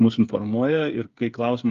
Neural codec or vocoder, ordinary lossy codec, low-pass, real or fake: codec, 16 kHz, 8 kbps, FreqCodec, smaller model; Opus, 32 kbps; 7.2 kHz; fake